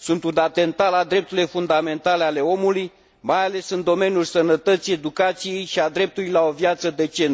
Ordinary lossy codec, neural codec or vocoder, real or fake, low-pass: none; none; real; none